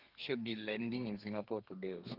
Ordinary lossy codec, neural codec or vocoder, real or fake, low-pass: Opus, 32 kbps; codec, 32 kHz, 1.9 kbps, SNAC; fake; 5.4 kHz